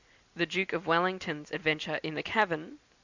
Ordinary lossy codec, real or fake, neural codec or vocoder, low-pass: Opus, 64 kbps; real; none; 7.2 kHz